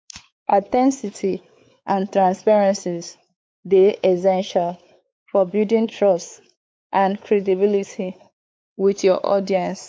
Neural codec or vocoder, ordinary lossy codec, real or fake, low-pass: codec, 16 kHz, 4 kbps, X-Codec, WavLM features, trained on Multilingual LibriSpeech; none; fake; none